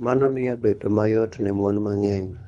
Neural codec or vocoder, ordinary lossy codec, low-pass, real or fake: codec, 24 kHz, 3 kbps, HILCodec; none; 10.8 kHz; fake